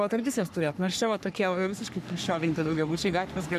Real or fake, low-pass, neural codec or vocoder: fake; 14.4 kHz; codec, 44.1 kHz, 3.4 kbps, Pupu-Codec